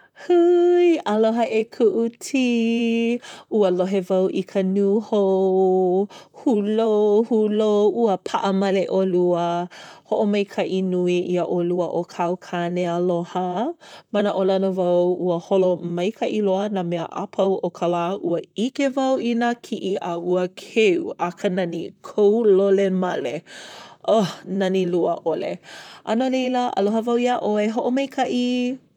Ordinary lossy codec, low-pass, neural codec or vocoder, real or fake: none; 19.8 kHz; vocoder, 44.1 kHz, 128 mel bands, Pupu-Vocoder; fake